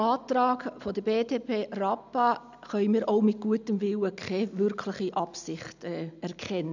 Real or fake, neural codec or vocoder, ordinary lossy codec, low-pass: real; none; none; 7.2 kHz